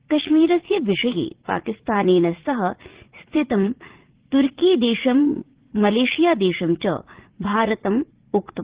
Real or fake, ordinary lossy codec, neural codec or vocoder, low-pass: fake; Opus, 16 kbps; vocoder, 44.1 kHz, 80 mel bands, Vocos; 3.6 kHz